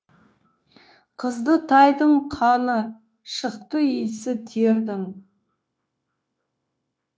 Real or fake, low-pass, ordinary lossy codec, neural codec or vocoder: fake; none; none; codec, 16 kHz, 0.9 kbps, LongCat-Audio-Codec